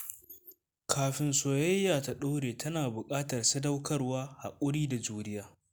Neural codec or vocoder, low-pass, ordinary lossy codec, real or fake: none; none; none; real